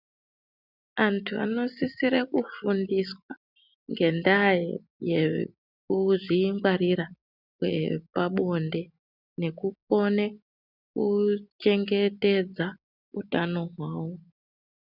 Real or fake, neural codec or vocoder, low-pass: real; none; 5.4 kHz